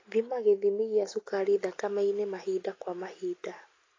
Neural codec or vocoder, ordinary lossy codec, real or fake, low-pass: none; AAC, 32 kbps; real; 7.2 kHz